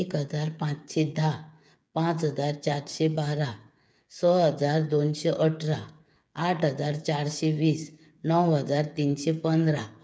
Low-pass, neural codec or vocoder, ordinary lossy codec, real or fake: none; codec, 16 kHz, 8 kbps, FreqCodec, smaller model; none; fake